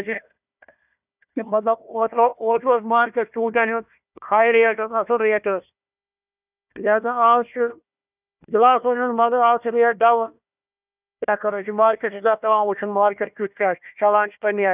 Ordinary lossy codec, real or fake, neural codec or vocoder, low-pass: none; fake; codec, 16 kHz, 1 kbps, FunCodec, trained on Chinese and English, 50 frames a second; 3.6 kHz